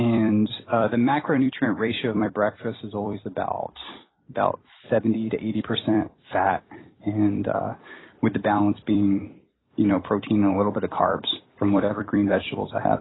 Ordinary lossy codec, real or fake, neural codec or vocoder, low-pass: AAC, 16 kbps; fake; vocoder, 44.1 kHz, 128 mel bands every 256 samples, BigVGAN v2; 7.2 kHz